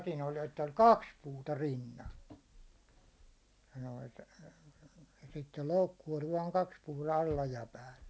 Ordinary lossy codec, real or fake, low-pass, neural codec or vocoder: none; real; none; none